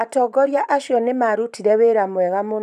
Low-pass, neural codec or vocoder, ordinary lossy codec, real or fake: 14.4 kHz; vocoder, 44.1 kHz, 128 mel bands every 512 samples, BigVGAN v2; none; fake